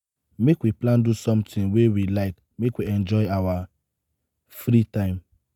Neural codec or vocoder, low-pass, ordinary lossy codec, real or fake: none; 19.8 kHz; none; real